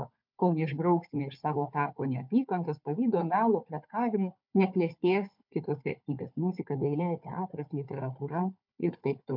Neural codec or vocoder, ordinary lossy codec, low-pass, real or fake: codec, 16 kHz, 4 kbps, FunCodec, trained on Chinese and English, 50 frames a second; MP3, 48 kbps; 5.4 kHz; fake